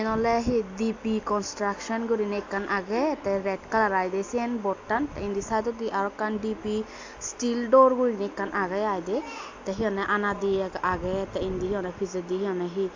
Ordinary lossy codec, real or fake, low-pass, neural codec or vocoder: AAC, 48 kbps; real; 7.2 kHz; none